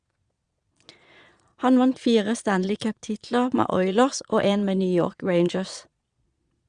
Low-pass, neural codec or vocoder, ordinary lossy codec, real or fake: 9.9 kHz; vocoder, 22.05 kHz, 80 mel bands, Vocos; Opus, 64 kbps; fake